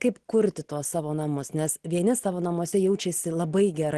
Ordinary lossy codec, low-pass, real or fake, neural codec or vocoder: Opus, 16 kbps; 10.8 kHz; real; none